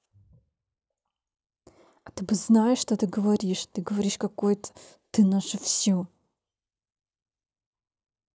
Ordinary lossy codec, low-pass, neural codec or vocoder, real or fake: none; none; none; real